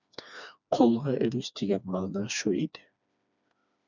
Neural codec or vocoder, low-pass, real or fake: codec, 16 kHz, 2 kbps, FreqCodec, smaller model; 7.2 kHz; fake